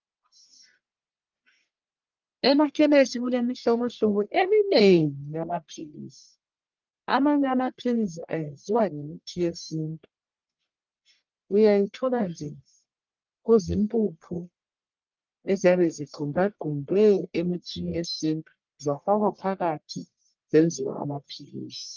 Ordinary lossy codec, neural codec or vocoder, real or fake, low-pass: Opus, 32 kbps; codec, 44.1 kHz, 1.7 kbps, Pupu-Codec; fake; 7.2 kHz